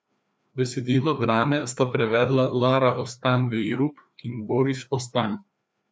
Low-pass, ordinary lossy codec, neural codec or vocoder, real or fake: none; none; codec, 16 kHz, 2 kbps, FreqCodec, larger model; fake